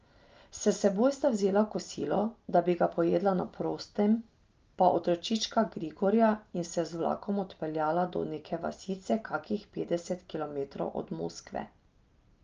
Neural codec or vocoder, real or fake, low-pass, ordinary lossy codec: none; real; 7.2 kHz; Opus, 24 kbps